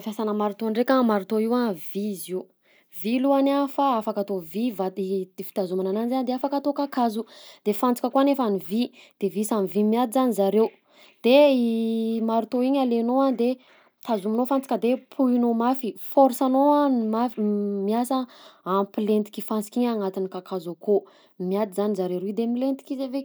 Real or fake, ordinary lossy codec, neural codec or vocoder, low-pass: real; none; none; none